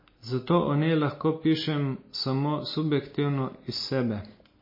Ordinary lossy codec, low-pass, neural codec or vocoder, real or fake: MP3, 24 kbps; 5.4 kHz; none; real